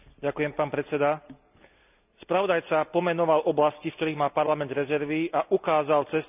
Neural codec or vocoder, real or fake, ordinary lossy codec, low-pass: none; real; none; 3.6 kHz